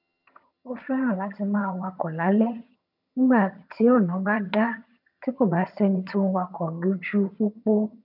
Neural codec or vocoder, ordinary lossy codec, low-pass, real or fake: vocoder, 22.05 kHz, 80 mel bands, HiFi-GAN; none; 5.4 kHz; fake